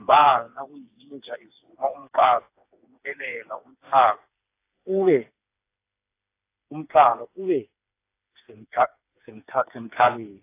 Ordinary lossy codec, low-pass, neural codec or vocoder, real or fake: AAC, 24 kbps; 3.6 kHz; codec, 16 kHz, 4 kbps, FreqCodec, smaller model; fake